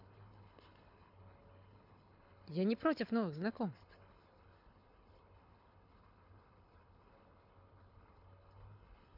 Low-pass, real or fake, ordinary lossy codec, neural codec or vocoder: 5.4 kHz; fake; AAC, 48 kbps; codec, 24 kHz, 6 kbps, HILCodec